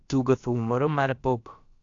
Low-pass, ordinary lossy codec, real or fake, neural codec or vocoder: 7.2 kHz; AAC, 48 kbps; fake; codec, 16 kHz, about 1 kbps, DyCAST, with the encoder's durations